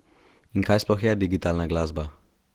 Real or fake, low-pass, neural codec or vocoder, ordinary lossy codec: real; 19.8 kHz; none; Opus, 16 kbps